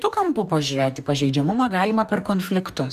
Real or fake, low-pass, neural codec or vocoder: fake; 14.4 kHz; codec, 44.1 kHz, 2.6 kbps, DAC